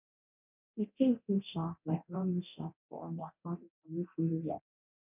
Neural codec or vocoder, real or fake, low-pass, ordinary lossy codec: codec, 24 kHz, 0.9 kbps, DualCodec; fake; 3.6 kHz; none